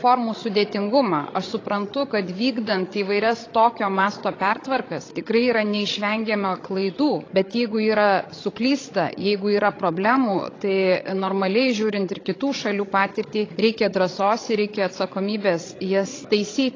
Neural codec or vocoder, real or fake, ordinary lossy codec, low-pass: codec, 16 kHz, 16 kbps, FreqCodec, larger model; fake; AAC, 32 kbps; 7.2 kHz